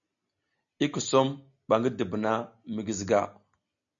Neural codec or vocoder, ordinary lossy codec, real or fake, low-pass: none; MP3, 64 kbps; real; 7.2 kHz